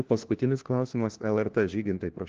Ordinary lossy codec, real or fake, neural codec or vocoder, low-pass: Opus, 16 kbps; fake; codec, 16 kHz, 1 kbps, FunCodec, trained on LibriTTS, 50 frames a second; 7.2 kHz